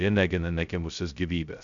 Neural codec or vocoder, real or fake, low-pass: codec, 16 kHz, 0.2 kbps, FocalCodec; fake; 7.2 kHz